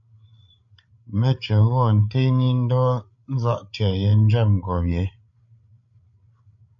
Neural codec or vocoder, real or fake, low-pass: codec, 16 kHz, 8 kbps, FreqCodec, larger model; fake; 7.2 kHz